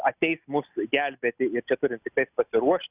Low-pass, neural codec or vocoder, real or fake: 3.6 kHz; none; real